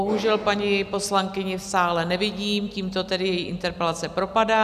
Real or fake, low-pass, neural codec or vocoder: real; 14.4 kHz; none